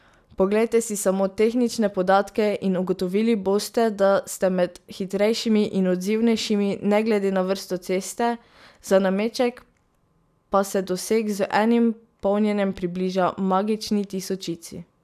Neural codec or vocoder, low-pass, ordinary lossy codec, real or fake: none; 14.4 kHz; none; real